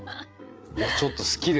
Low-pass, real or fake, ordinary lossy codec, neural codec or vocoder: none; fake; none; codec, 16 kHz, 8 kbps, FreqCodec, larger model